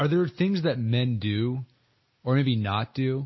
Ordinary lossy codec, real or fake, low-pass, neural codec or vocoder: MP3, 24 kbps; real; 7.2 kHz; none